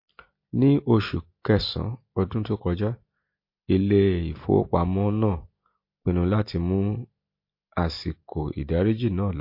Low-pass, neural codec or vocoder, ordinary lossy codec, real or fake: 5.4 kHz; none; MP3, 32 kbps; real